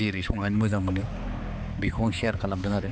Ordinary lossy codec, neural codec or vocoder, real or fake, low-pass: none; codec, 16 kHz, 4 kbps, X-Codec, HuBERT features, trained on balanced general audio; fake; none